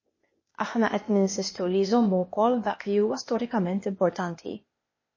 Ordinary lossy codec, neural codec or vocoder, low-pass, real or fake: MP3, 32 kbps; codec, 16 kHz, 0.8 kbps, ZipCodec; 7.2 kHz; fake